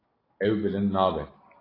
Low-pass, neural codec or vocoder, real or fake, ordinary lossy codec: 5.4 kHz; none; real; AAC, 24 kbps